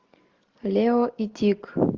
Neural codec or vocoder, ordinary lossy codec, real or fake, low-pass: none; Opus, 16 kbps; real; 7.2 kHz